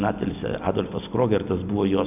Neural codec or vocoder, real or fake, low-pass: none; real; 3.6 kHz